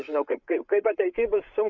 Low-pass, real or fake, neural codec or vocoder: 7.2 kHz; fake; codec, 16 kHz, 8 kbps, FreqCodec, larger model